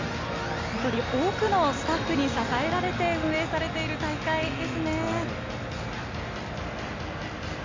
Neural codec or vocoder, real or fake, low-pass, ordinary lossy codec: none; real; 7.2 kHz; MP3, 48 kbps